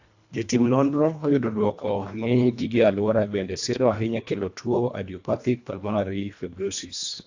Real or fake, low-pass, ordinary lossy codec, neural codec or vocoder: fake; 7.2 kHz; AAC, 48 kbps; codec, 24 kHz, 1.5 kbps, HILCodec